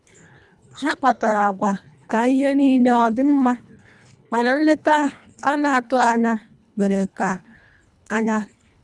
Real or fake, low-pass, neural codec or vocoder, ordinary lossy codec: fake; none; codec, 24 kHz, 1.5 kbps, HILCodec; none